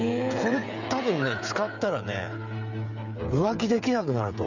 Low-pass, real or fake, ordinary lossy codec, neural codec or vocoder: 7.2 kHz; fake; none; codec, 16 kHz, 8 kbps, FreqCodec, smaller model